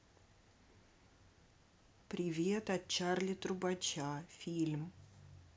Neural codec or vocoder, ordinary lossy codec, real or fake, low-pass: none; none; real; none